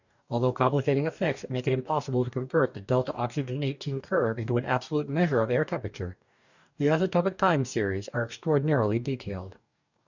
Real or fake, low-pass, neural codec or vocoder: fake; 7.2 kHz; codec, 44.1 kHz, 2.6 kbps, DAC